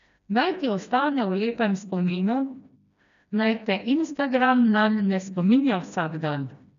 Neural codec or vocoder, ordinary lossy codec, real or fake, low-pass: codec, 16 kHz, 1 kbps, FreqCodec, smaller model; MP3, 96 kbps; fake; 7.2 kHz